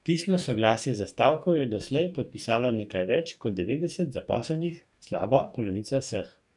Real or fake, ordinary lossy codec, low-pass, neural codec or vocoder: fake; none; 10.8 kHz; codec, 44.1 kHz, 2.6 kbps, DAC